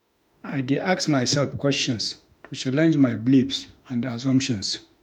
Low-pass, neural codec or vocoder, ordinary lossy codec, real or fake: 19.8 kHz; autoencoder, 48 kHz, 32 numbers a frame, DAC-VAE, trained on Japanese speech; none; fake